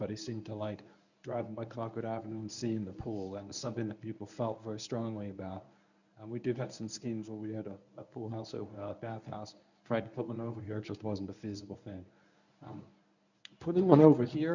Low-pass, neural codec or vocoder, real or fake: 7.2 kHz; codec, 24 kHz, 0.9 kbps, WavTokenizer, medium speech release version 1; fake